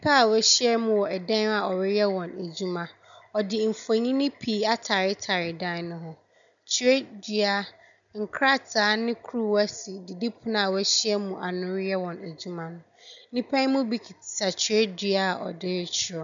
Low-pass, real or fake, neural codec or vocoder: 7.2 kHz; real; none